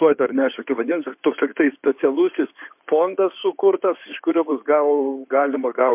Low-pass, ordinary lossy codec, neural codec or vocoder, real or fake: 3.6 kHz; MP3, 32 kbps; codec, 16 kHz in and 24 kHz out, 2.2 kbps, FireRedTTS-2 codec; fake